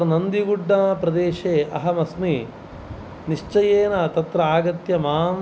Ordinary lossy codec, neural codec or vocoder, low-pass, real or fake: none; none; none; real